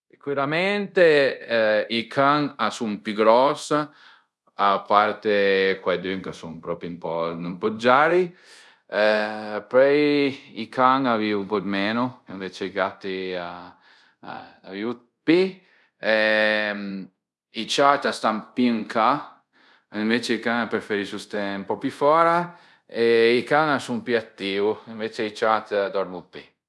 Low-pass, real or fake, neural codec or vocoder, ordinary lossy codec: none; fake; codec, 24 kHz, 0.5 kbps, DualCodec; none